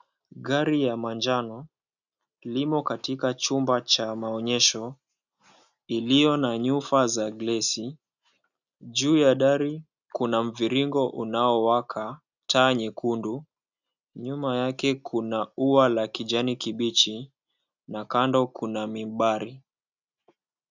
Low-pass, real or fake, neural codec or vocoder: 7.2 kHz; real; none